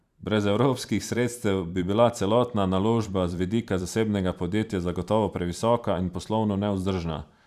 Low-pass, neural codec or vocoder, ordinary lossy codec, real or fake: 14.4 kHz; vocoder, 44.1 kHz, 128 mel bands every 512 samples, BigVGAN v2; none; fake